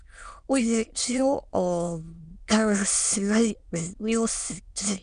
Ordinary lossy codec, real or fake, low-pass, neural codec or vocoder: Opus, 64 kbps; fake; 9.9 kHz; autoencoder, 22.05 kHz, a latent of 192 numbers a frame, VITS, trained on many speakers